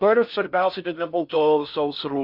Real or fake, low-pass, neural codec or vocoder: fake; 5.4 kHz; codec, 16 kHz in and 24 kHz out, 0.6 kbps, FocalCodec, streaming, 4096 codes